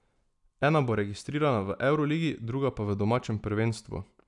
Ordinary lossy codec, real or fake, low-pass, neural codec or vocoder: none; real; 10.8 kHz; none